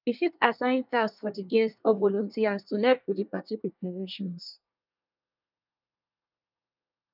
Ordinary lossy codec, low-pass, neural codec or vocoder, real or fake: none; 5.4 kHz; codec, 24 kHz, 1 kbps, SNAC; fake